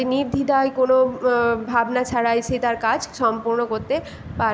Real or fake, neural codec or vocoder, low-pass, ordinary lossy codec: real; none; none; none